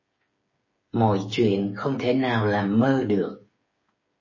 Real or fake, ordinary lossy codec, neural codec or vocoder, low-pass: fake; MP3, 32 kbps; codec, 16 kHz, 8 kbps, FreqCodec, smaller model; 7.2 kHz